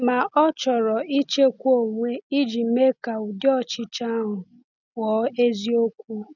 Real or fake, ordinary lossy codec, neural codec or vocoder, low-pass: real; none; none; 7.2 kHz